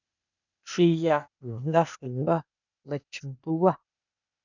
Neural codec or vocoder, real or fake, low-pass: codec, 16 kHz, 0.8 kbps, ZipCodec; fake; 7.2 kHz